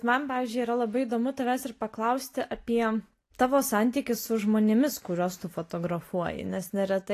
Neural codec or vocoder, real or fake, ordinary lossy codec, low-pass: none; real; AAC, 48 kbps; 14.4 kHz